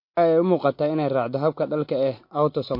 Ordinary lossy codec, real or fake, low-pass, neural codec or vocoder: MP3, 32 kbps; real; 5.4 kHz; none